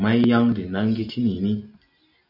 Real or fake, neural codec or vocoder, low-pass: real; none; 5.4 kHz